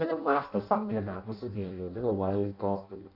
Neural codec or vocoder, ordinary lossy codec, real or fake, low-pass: codec, 16 kHz in and 24 kHz out, 0.6 kbps, FireRedTTS-2 codec; none; fake; 5.4 kHz